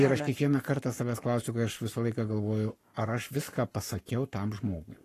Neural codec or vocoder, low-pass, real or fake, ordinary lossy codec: codec, 44.1 kHz, 7.8 kbps, Pupu-Codec; 14.4 kHz; fake; AAC, 48 kbps